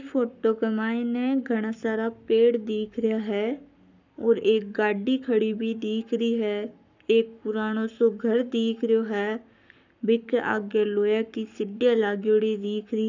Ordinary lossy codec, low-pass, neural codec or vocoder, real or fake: none; 7.2 kHz; codec, 44.1 kHz, 7.8 kbps, Pupu-Codec; fake